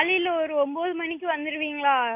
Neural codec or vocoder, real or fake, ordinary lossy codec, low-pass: none; real; MP3, 24 kbps; 3.6 kHz